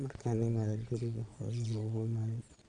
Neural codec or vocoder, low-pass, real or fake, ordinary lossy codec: vocoder, 22.05 kHz, 80 mel bands, Vocos; 9.9 kHz; fake; none